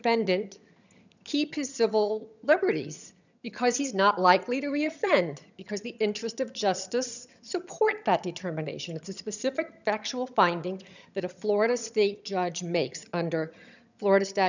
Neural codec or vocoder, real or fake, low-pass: vocoder, 22.05 kHz, 80 mel bands, HiFi-GAN; fake; 7.2 kHz